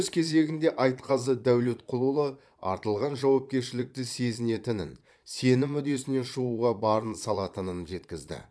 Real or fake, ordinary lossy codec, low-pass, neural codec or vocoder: fake; none; none; vocoder, 22.05 kHz, 80 mel bands, Vocos